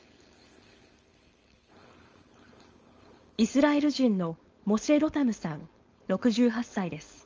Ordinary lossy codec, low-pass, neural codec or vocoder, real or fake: Opus, 24 kbps; 7.2 kHz; codec, 16 kHz, 4.8 kbps, FACodec; fake